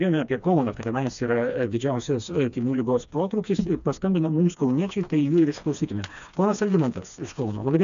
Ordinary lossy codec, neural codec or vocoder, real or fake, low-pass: AAC, 96 kbps; codec, 16 kHz, 2 kbps, FreqCodec, smaller model; fake; 7.2 kHz